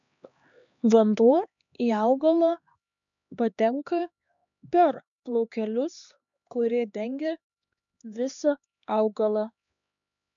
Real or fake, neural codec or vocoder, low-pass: fake; codec, 16 kHz, 2 kbps, X-Codec, HuBERT features, trained on LibriSpeech; 7.2 kHz